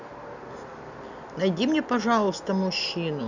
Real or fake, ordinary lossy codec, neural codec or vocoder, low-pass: real; none; none; 7.2 kHz